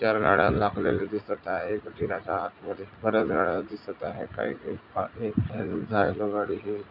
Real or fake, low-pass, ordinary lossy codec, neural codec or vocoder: fake; 5.4 kHz; none; vocoder, 44.1 kHz, 80 mel bands, Vocos